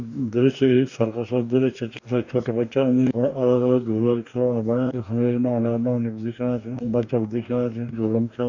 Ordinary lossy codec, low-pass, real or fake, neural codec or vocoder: none; 7.2 kHz; fake; codec, 44.1 kHz, 2.6 kbps, DAC